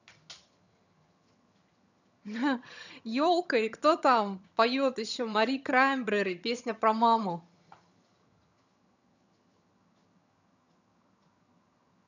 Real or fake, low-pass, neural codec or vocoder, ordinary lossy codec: fake; 7.2 kHz; vocoder, 22.05 kHz, 80 mel bands, HiFi-GAN; none